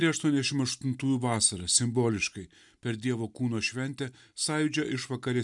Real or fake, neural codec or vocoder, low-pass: real; none; 10.8 kHz